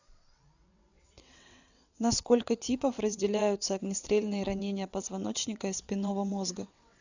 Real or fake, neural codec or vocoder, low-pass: fake; vocoder, 22.05 kHz, 80 mel bands, WaveNeXt; 7.2 kHz